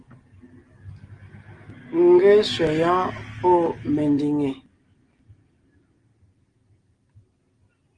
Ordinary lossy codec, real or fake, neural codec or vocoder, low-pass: Opus, 24 kbps; real; none; 9.9 kHz